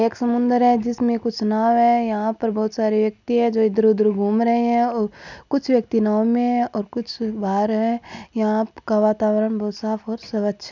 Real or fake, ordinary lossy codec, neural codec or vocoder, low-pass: real; none; none; 7.2 kHz